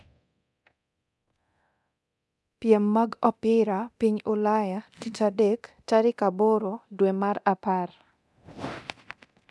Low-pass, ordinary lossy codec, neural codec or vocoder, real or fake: none; none; codec, 24 kHz, 0.9 kbps, DualCodec; fake